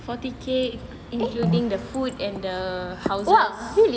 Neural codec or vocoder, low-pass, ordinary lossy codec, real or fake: none; none; none; real